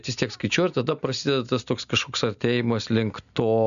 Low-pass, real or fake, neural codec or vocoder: 7.2 kHz; real; none